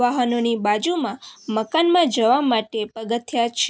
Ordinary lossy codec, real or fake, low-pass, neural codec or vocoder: none; real; none; none